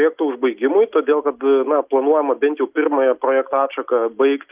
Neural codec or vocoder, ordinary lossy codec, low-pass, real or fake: none; Opus, 24 kbps; 3.6 kHz; real